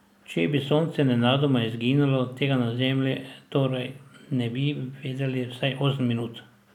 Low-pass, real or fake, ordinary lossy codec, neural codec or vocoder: 19.8 kHz; real; none; none